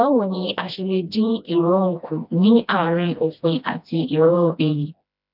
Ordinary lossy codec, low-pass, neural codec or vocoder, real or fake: none; 5.4 kHz; codec, 16 kHz, 1 kbps, FreqCodec, smaller model; fake